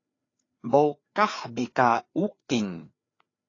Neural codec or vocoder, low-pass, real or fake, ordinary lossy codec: codec, 16 kHz, 4 kbps, FreqCodec, larger model; 7.2 kHz; fake; AAC, 32 kbps